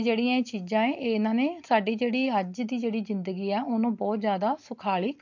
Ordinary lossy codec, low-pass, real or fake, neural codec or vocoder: MP3, 48 kbps; 7.2 kHz; real; none